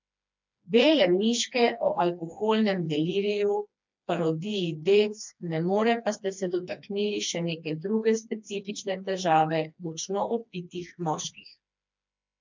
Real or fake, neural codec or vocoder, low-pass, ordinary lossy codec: fake; codec, 16 kHz, 2 kbps, FreqCodec, smaller model; 7.2 kHz; MP3, 64 kbps